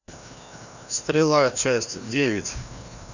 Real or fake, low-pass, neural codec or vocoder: fake; 7.2 kHz; codec, 16 kHz, 1 kbps, FreqCodec, larger model